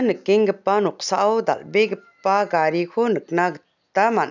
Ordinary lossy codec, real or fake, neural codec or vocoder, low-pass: none; real; none; 7.2 kHz